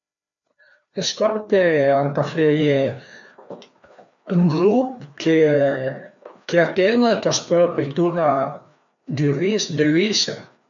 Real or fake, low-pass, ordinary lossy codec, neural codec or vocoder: fake; 7.2 kHz; MP3, 64 kbps; codec, 16 kHz, 2 kbps, FreqCodec, larger model